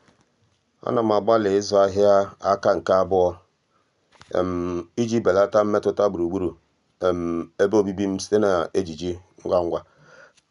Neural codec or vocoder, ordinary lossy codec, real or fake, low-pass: none; none; real; 10.8 kHz